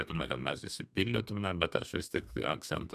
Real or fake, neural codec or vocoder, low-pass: fake; codec, 32 kHz, 1.9 kbps, SNAC; 14.4 kHz